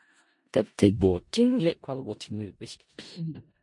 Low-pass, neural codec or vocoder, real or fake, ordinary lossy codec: 10.8 kHz; codec, 16 kHz in and 24 kHz out, 0.4 kbps, LongCat-Audio-Codec, four codebook decoder; fake; MP3, 48 kbps